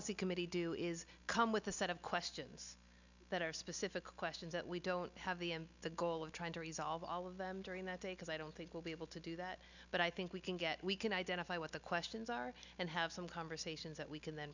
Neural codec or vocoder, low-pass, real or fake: none; 7.2 kHz; real